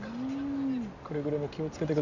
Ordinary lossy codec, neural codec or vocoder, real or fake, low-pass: none; none; real; 7.2 kHz